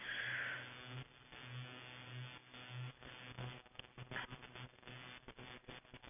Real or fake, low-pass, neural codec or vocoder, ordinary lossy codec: real; 3.6 kHz; none; none